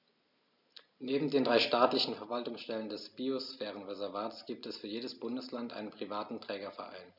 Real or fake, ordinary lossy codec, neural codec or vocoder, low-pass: real; none; none; 5.4 kHz